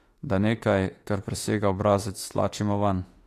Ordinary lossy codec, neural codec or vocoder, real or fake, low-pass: AAC, 48 kbps; autoencoder, 48 kHz, 32 numbers a frame, DAC-VAE, trained on Japanese speech; fake; 14.4 kHz